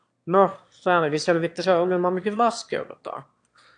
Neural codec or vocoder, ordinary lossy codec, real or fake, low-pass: autoencoder, 22.05 kHz, a latent of 192 numbers a frame, VITS, trained on one speaker; AAC, 64 kbps; fake; 9.9 kHz